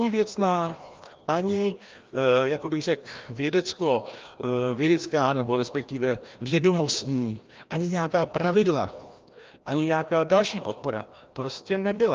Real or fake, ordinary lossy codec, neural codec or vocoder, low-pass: fake; Opus, 24 kbps; codec, 16 kHz, 1 kbps, FreqCodec, larger model; 7.2 kHz